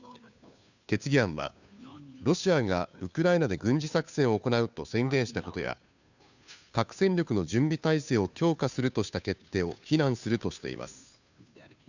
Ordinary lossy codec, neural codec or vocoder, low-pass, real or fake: none; codec, 16 kHz, 2 kbps, FunCodec, trained on Chinese and English, 25 frames a second; 7.2 kHz; fake